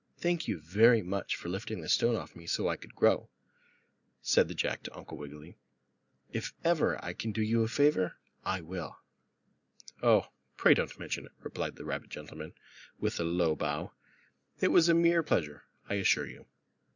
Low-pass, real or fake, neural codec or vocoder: 7.2 kHz; real; none